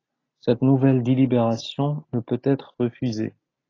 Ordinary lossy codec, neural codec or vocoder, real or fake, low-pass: AAC, 32 kbps; none; real; 7.2 kHz